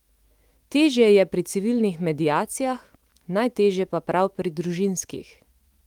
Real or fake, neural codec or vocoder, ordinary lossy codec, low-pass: fake; autoencoder, 48 kHz, 128 numbers a frame, DAC-VAE, trained on Japanese speech; Opus, 24 kbps; 19.8 kHz